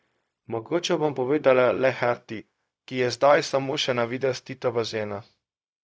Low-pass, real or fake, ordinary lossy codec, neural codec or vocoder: none; fake; none; codec, 16 kHz, 0.4 kbps, LongCat-Audio-Codec